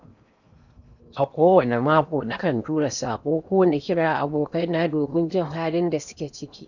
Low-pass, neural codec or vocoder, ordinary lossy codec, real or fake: 7.2 kHz; codec, 16 kHz in and 24 kHz out, 0.8 kbps, FocalCodec, streaming, 65536 codes; none; fake